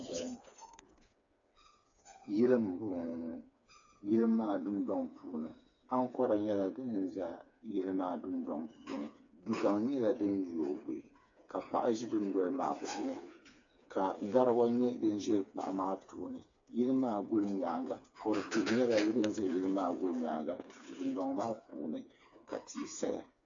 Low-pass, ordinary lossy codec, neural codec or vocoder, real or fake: 7.2 kHz; AAC, 64 kbps; codec, 16 kHz, 4 kbps, FreqCodec, smaller model; fake